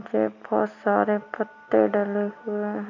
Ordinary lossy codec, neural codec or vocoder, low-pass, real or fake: none; none; 7.2 kHz; real